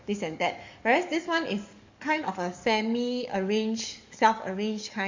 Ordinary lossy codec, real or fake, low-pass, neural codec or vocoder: MP3, 64 kbps; fake; 7.2 kHz; codec, 44.1 kHz, 7.8 kbps, DAC